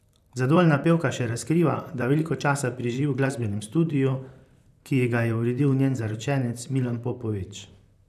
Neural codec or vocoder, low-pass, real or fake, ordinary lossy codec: vocoder, 44.1 kHz, 128 mel bands, Pupu-Vocoder; 14.4 kHz; fake; none